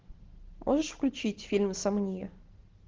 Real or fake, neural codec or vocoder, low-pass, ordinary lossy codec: real; none; 7.2 kHz; Opus, 16 kbps